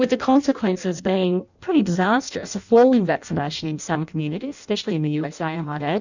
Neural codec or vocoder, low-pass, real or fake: codec, 16 kHz in and 24 kHz out, 0.6 kbps, FireRedTTS-2 codec; 7.2 kHz; fake